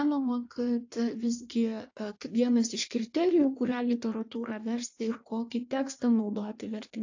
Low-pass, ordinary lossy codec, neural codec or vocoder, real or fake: 7.2 kHz; AAC, 48 kbps; codec, 16 kHz in and 24 kHz out, 1.1 kbps, FireRedTTS-2 codec; fake